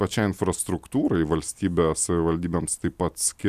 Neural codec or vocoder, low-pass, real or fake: none; 14.4 kHz; real